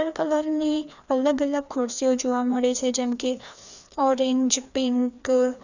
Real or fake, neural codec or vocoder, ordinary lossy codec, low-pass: fake; codec, 16 kHz, 2 kbps, FreqCodec, larger model; none; 7.2 kHz